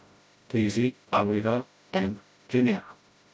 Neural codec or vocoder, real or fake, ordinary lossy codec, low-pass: codec, 16 kHz, 0.5 kbps, FreqCodec, smaller model; fake; none; none